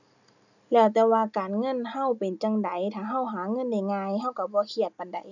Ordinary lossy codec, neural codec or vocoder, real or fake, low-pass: none; none; real; 7.2 kHz